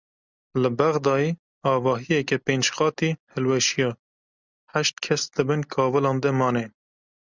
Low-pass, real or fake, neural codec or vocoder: 7.2 kHz; real; none